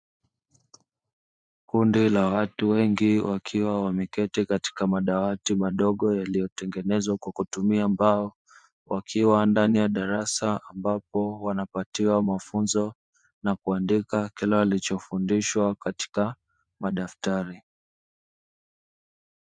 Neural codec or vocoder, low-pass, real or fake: vocoder, 24 kHz, 100 mel bands, Vocos; 9.9 kHz; fake